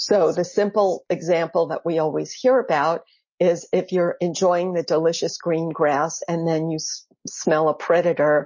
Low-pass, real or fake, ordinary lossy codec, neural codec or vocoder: 7.2 kHz; real; MP3, 32 kbps; none